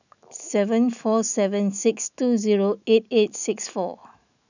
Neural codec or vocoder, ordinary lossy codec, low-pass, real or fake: autoencoder, 48 kHz, 128 numbers a frame, DAC-VAE, trained on Japanese speech; none; 7.2 kHz; fake